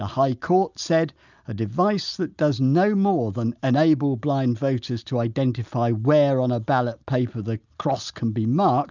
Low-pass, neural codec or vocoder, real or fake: 7.2 kHz; none; real